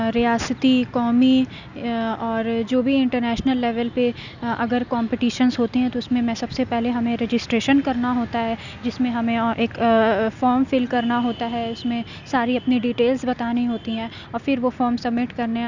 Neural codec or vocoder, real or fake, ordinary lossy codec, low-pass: none; real; none; 7.2 kHz